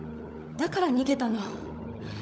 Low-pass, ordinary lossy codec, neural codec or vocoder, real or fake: none; none; codec, 16 kHz, 16 kbps, FunCodec, trained on LibriTTS, 50 frames a second; fake